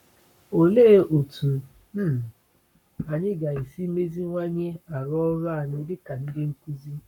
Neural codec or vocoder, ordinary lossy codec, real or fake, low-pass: codec, 44.1 kHz, 7.8 kbps, Pupu-Codec; none; fake; 19.8 kHz